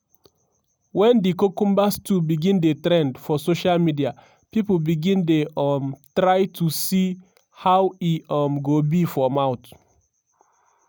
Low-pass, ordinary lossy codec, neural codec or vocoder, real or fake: none; none; none; real